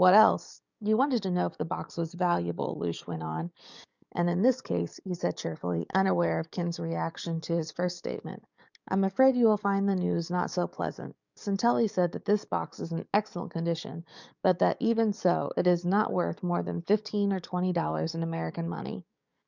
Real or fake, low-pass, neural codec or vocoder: fake; 7.2 kHz; codec, 44.1 kHz, 7.8 kbps, DAC